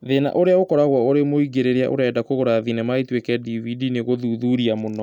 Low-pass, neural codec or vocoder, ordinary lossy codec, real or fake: 19.8 kHz; none; none; real